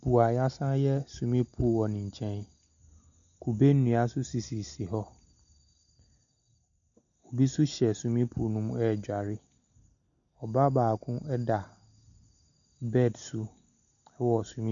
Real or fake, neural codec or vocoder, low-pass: real; none; 7.2 kHz